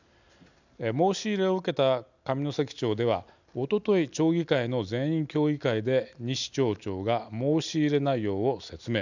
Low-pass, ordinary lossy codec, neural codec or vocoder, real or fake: 7.2 kHz; none; none; real